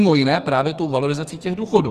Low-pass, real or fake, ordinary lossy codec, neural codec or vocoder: 14.4 kHz; fake; Opus, 32 kbps; codec, 44.1 kHz, 2.6 kbps, SNAC